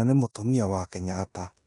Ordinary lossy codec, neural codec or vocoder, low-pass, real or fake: none; codec, 16 kHz in and 24 kHz out, 0.9 kbps, LongCat-Audio-Codec, four codebook decoder; 10.8 kHz; fake